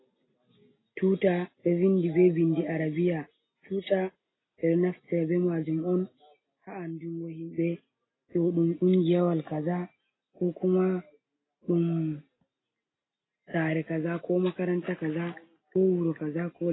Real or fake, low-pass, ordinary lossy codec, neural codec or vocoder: real; 7.2 kHz; AAC, 16 kbps; none